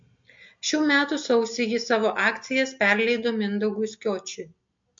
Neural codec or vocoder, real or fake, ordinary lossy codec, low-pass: none; real; MP3, 48 kbps; 7.2 kHz